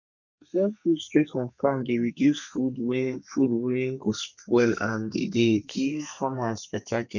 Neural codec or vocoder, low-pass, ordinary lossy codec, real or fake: codec, 32 kHz, 1.9 kbps, SNAC; 7.2 kHz; none; fake